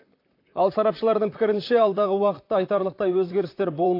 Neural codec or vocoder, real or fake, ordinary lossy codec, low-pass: vocoder, 44.1 kHz, 128 mel bands, Pupu-Vocoder; fake; AAC, 32 kbps; 5.4 kHz